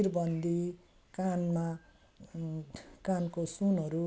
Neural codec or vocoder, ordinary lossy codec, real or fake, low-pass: none; none; real; none